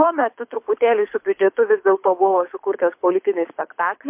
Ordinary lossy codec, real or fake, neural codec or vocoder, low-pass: MP3, 32 kbps; fake; codec, 24 kHz, 6 kbps, HILCodec; 3.6 kHz